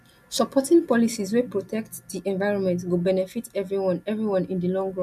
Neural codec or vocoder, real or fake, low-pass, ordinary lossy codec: none; real; 14.4 kHz; none